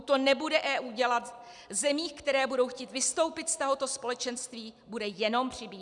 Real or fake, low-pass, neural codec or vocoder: real; 10.8 kHz; none